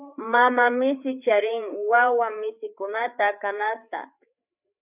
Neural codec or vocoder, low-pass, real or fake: codec, 16 kHz, 4 kbps, FreqCodec, larger model; 3.6 kHz; fake